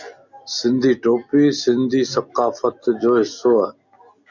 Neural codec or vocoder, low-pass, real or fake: none; 7.2 kHz; real